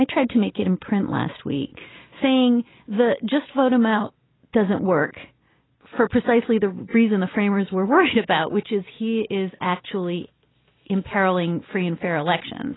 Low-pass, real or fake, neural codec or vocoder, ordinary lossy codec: 7.2 kHz; real; none; AAC, 16 kbps